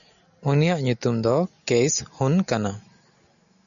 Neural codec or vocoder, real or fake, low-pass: none; real; 7.2 kHz